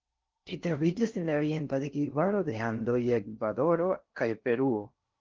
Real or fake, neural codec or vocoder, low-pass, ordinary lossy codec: fake; codec, 16 kHz in and 24 kHz out, 0.6 kbps, FocalCodec, streaming, 4096 codes; 7.2 kHz; Opus, 32 kbps